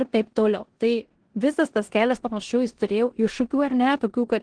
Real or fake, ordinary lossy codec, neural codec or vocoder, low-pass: fake; Opus, 16 kbps; codec, 16 kHz in and 24 kHz out, 0.9 kbps, LongCat-Audio-Codec, four codebook decoder; 9.9 kHz